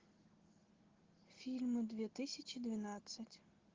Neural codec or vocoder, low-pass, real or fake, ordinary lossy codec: none; 7.2 kHz; real; Opus, 16 kbps